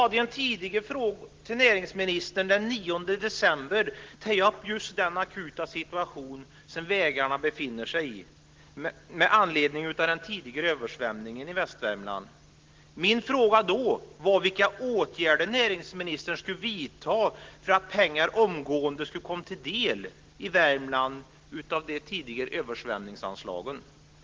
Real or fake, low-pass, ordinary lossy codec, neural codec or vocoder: real; 7.2 kHz; Opus, 16 kbps; none